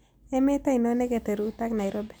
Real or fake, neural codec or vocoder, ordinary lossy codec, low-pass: real; none; none; none